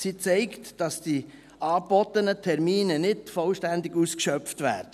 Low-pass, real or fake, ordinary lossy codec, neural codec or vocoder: 14.4 kHz; real; none; none